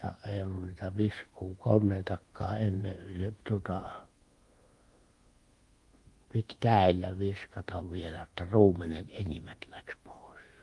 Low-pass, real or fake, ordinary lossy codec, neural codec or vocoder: 10.8 kHz; fake; Opus, 24 kbps; codec, 24 kHz, 1.2 kbps, DualCodec